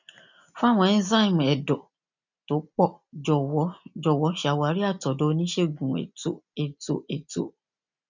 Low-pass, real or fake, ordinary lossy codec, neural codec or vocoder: 7.2 kHz; real; none; none